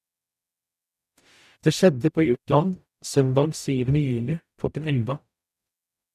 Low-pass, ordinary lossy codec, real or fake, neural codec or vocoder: 14.4 kHz; none; fake; codec, 44.1 kHz, 0.9 kbps, DAC